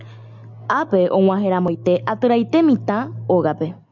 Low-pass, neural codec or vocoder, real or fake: 7.2 kHz; none; real